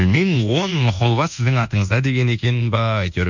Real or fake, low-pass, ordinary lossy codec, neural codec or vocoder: fake; 7.2 kHz; none; codec, 24 kHz, 1.2 kbps, DualCodec